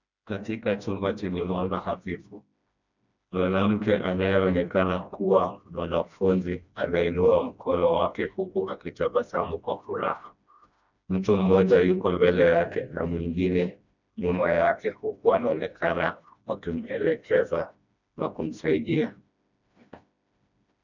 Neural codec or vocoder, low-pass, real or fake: codec, 16 kHz, 1 kbps, FreqCodec, smaller model; 7.2 kHz; fake